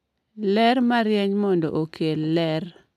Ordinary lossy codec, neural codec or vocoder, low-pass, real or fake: MP3, 96 kbps; none; 14.4 kHz; real